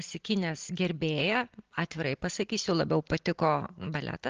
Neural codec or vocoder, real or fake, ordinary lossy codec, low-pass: none; real; Opus, 16 kbps; 7.2 kHz